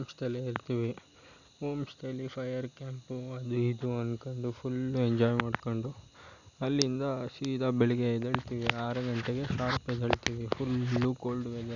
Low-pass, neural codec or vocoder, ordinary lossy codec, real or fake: 7.2 kHz; none; none; real